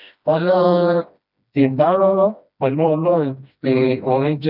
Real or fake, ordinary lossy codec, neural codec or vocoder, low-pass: fake; none; codec, 16 kHz, 1 kbps, FreqCodec, smaller model; 5.4 kHz